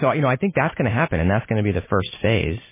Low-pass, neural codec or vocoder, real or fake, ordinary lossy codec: 3.6 kHz; none; real; MP3, 16 kbps